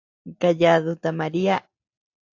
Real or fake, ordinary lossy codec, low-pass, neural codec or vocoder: real; AAC, 48 kbps; 7.2 kHz; none